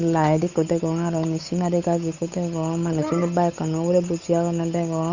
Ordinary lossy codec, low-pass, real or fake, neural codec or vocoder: none; 7.2 kHz; fake; codec, 16 kHz, 8 kbps, FunCodec, trained on Chinese and English, 25 frames a second